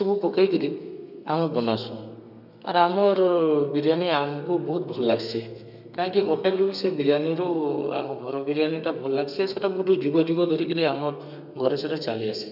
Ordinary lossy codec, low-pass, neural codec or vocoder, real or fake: none; 5.4 kHz; codec, 32 kHz, 1.9 kbps, SNAC; fake